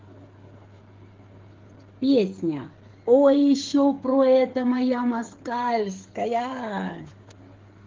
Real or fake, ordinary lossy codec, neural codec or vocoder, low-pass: fake; Opus, 16 kbps; codec, 16 kHz, 8 kbps, FreqCodec, smaller model; 7.2 kHz